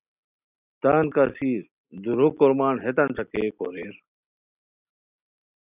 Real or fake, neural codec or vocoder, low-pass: real; none; 3.6 kHz